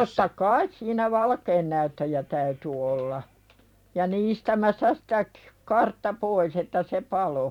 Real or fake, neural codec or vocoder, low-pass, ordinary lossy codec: fake; vocoder, 44.1 kHz, 128 mel bands every 512 samples, BigVGAN v2; 19.8 kHz; none